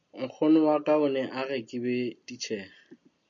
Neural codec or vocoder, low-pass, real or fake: none; 7.2 kHz; real